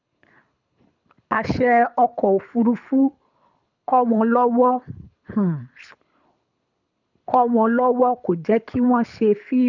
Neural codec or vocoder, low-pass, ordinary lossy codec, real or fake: codec, 24 kHz, 6 kbps, HILCodec; 7.2 kHz; none; fake